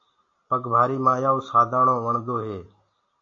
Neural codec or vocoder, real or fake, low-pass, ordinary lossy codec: none; real; 7.2 kHz; AAC, 48 kbps